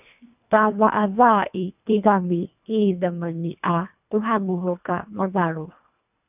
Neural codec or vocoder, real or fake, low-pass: codec, 24 kHz, 1.5 kbps, HILCodec; fake; 3.6 kHz